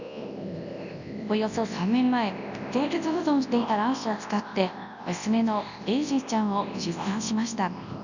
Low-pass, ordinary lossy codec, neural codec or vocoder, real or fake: 7.2 kHz; none; codec, 24 kHz, 0.9 kbps, WavTokenizer, large speech release; fake